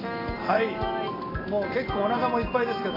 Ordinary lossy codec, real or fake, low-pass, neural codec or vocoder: AAC, 24 kbps; real; 5.4 kHz; none